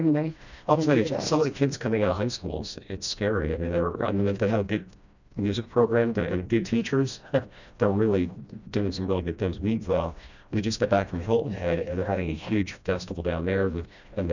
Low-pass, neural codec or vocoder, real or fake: 7.2 kHz; codec, 16 kHz, 1 kbps, FreqCodec, smaller model; fake